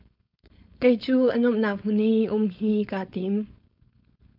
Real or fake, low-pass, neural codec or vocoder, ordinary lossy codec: fake; 5.4 kHz; codec, 16 kHz, 4.8 kbps, FACodec; MP3, 48 kbps